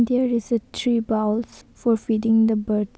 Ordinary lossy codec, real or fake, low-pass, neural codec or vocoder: none; real; none; none